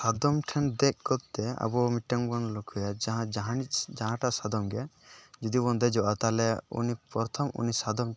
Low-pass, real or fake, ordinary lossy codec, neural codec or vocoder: none; real; none; none